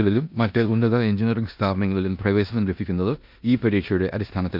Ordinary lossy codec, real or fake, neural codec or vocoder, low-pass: MP3, 48 kbps; fake; codec, 16 kHz in and 24 kHz out, 0.9 kbps, LongCat-Audio-Codec, four codebook decoder; 5.4 kHz